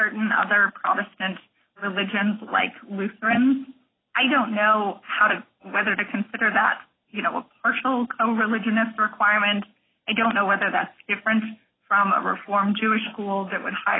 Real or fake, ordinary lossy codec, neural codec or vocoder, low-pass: real; AAC, 16 kbps; none; 7.2 kHz